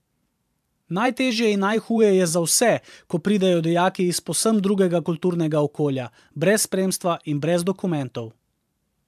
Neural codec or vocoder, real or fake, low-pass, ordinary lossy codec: vocoder, 44.1 kHz, 128 mel bands every 512 samples, BigVGAN v2; fake; 14.4 kHz; AAC, 96 kbps